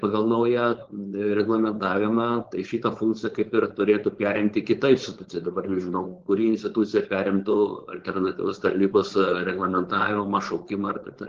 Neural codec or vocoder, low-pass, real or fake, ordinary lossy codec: codec, 16 kHz, 4.8 kbps, FACodec; 7.2 kHz; fake; Opus, 16 kbps